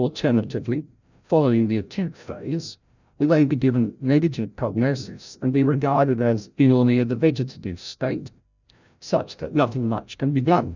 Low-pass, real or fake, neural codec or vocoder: 7.2 kHz; fake; codec, 16 kHz, 0.5 kbps, FreqCodec, larger model